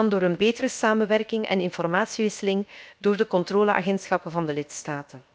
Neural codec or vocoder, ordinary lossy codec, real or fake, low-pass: codec, 16 kHz, 0.7 kbps, FocalCodec; none; fake; none